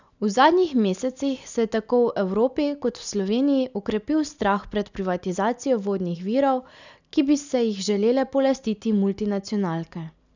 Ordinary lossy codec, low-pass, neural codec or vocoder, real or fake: none; 7.2 kHz; none; real